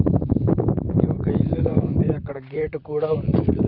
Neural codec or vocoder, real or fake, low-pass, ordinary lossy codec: vocoder, 44.1 kHz, 128 mel bands, Pupu-Vocoder; fake; 5.4 kHz; none